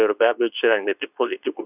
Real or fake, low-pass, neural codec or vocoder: fake; 3.6 kHz; codec, 24 kHz, 0.9 kbps, WavTokenizer, medium speech release version 2